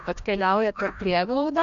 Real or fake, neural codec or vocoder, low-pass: fake; codec, 16 kHz, 1 kbps, FreqCodec, larger model; 7.2 kHz